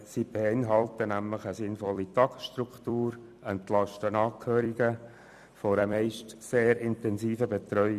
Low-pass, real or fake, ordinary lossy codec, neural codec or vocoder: 14.4 kHz; fake; none; vocoder, 44.1 kHz, 128 mel bands every 256 samples, BigVGAN v2